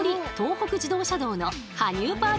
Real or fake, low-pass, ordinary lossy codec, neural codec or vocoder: real; none; none; none